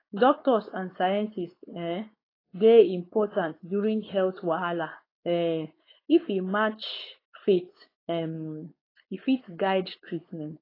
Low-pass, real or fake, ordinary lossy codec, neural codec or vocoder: 5.4 kHz; fake; AAC, 24 kbps; codec, 16 kHz, 4.8 kbps, FACodec